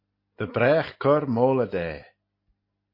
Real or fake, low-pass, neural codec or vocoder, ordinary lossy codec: real; 5.4 kHz; none; MP3, 32 kbps